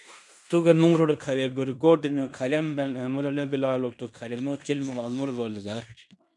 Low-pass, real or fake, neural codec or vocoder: 10.8 kHz; fake; codec, 16 kHz in and 24 kHz out, 0.9 kbps, LongCat-Audio-Codec, fine tuned four codebook decoder